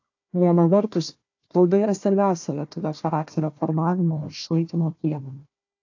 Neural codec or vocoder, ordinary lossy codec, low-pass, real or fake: codec, 16 kHz, 1 kbps, FunCodec, trained on Chinese and English, 50 frames a second; AAC, 48 kbps; 7.2 kHz; fake